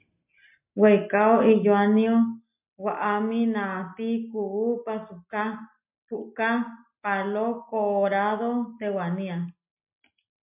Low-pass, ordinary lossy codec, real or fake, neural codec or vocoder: 3.6 kHz; MP3, 32 kbps; real; none